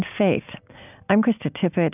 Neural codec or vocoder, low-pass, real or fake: none; 3.6 kHz; real